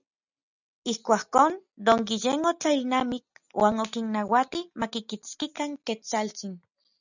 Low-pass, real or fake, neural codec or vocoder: 7.2 kHz; real; none